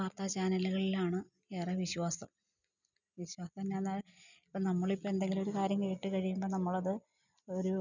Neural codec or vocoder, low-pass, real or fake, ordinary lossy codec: none; 7.2 kHz; real; none